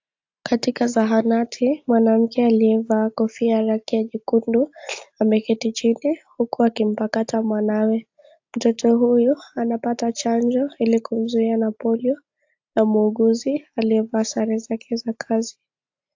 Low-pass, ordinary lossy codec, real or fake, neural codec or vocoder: 7.2 kHz; AAC, 48 kbps; real; none